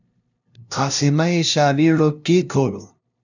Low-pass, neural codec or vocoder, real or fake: 7.2 kHz; codec, 16 kHz, 0.5 kbps, FunCodec, trained on LibriTTS, 25 frames a second; fake